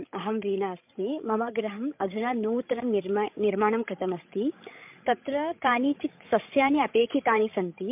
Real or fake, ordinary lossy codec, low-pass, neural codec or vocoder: fake; MP3, 32 kbps; 3.6 kHz; codec, 16 kHz, 16 kbps, FreqCodec, larger model